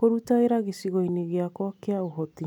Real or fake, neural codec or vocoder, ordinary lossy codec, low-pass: real; none; none; 19.8 kHz